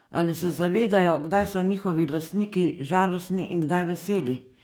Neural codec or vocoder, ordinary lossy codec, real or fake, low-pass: codec, 44.1 kHz, 2.6 kbps, DAC; none; fake; none